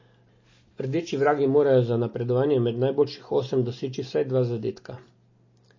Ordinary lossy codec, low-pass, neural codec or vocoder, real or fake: MP3, 32 kbps; 7.2 kHz; none; real